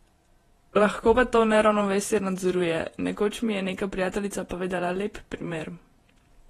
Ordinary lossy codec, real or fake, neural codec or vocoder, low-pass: AAC, 32 kbps; fake; vocoder, 48 kHz, 128 mel bands, Vocos; 19.8 kHz